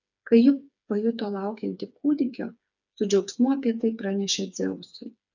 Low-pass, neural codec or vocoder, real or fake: 7.2 kHz; codec, 16 kHz, 4 kbps, FreqCodec, smaller model; fake